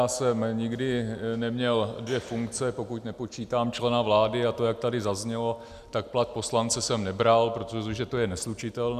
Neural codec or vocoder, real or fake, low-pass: none; real; 14.4 kHz